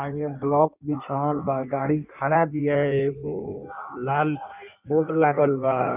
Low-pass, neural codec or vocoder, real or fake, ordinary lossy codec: 3.6 kHz; codec, 16 kHz in and 24 kHz out, 1.1 kbps, FireRedTTS-2 codec; fake; none